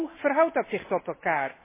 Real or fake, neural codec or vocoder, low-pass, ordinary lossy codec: real; none; 3.6 kHz; MP3, 16 kbps